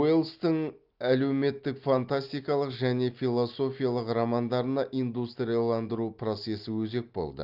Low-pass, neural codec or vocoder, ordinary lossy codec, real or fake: 5.4 kHz; none; Opus, 32 kbps; real